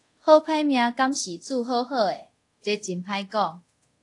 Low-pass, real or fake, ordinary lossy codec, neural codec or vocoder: 10.8 kHz; fake; AAC, 48 kbps; codec, 24 kHz, 0.5 kbps, DualCodec